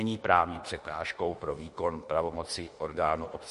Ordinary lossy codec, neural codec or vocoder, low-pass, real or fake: MP3, 48 kbps; autoencoder, 48 kHz, 32 numbers a frame, DAC-VAE, trained on Japanese speech; 14.4 kHz; fake